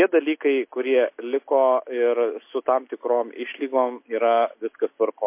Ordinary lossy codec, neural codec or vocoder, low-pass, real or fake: MP3, 24 kbps; none; 3.6 kHz; real